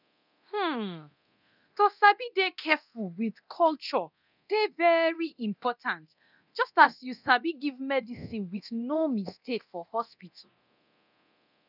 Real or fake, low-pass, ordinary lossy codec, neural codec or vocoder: fake; 5.4 kHz; none; codec, 24 kHz, 0.9 kbps, DualCodec